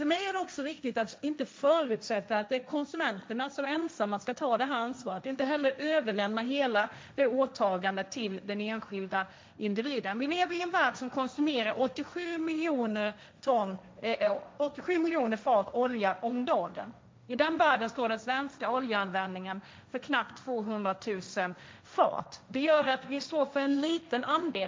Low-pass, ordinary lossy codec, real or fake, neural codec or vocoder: none; none; fake; codec, 16 kHz, 1.1 kbps, Voila-Tokenizer